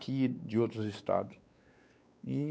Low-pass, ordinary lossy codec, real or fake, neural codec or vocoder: none; none; fake; codec, 16 kHz, 4 kbps, X-Codec, WavLM features, trained on Multilingual LibriSpeech